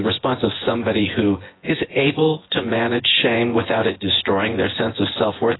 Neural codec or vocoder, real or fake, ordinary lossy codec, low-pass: vocoder, 24 kHz, 100 mel bands, Vocos; fake; AAC, 16 kbps; 7.2 kHz